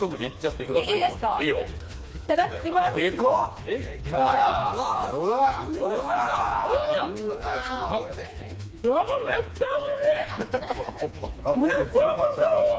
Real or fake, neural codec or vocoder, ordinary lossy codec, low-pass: fake; codec, 16 kHz, 2 kbps, FreqCodec, smaller model; none; none